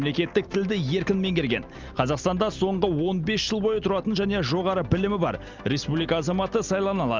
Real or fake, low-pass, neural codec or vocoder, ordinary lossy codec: real; 7.2 kHz; none; Opus, 32 kbps